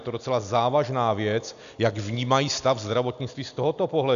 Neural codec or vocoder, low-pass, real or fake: none; 7.2 kHz; real